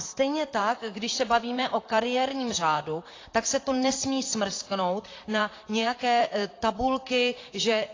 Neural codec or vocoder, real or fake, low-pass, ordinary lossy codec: vocoder, 44.1 kHz, 128 mel bands, Pupu-Vocoder; fake; 7.2 kHz; AAC, 32 kbps